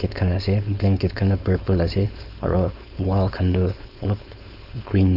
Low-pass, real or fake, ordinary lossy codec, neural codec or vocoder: 5.4 kHz; fake; none; codec, 16 kHz, 4.8 kbps, FACodec